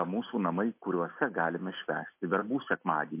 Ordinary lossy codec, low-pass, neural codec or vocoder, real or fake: MP3, 24 kbps; 3.6 kHz; none; real